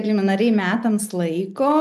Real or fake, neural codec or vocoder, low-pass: real; none; 14.4 kHz